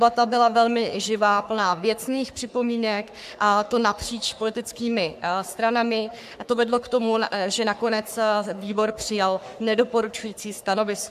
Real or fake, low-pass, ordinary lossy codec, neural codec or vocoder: fake; 14.4 kHz; AAC, 96 kbps; codec, 44.1 kHz, 3.4 kbps, Pupu-Codec